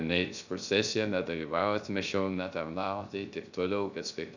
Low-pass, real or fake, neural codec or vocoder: 7.2 kHz; fake; codec, 16 kHz, 0.3 kbps, FocalCodec